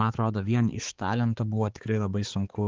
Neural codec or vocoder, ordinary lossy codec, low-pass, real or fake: codec, 16 kHz, 4 kbps, X-Codec, HuBERT features, trained on balanced general audio; Opus, 16 kbps; 7.2 kHz; fake